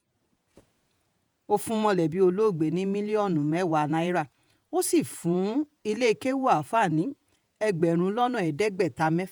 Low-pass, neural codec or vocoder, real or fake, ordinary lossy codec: none; vocoder, 48 kHz, 128 mel bands, Vocos; fake; none